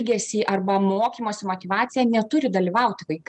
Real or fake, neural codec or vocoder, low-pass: real; none; 10.8 kHz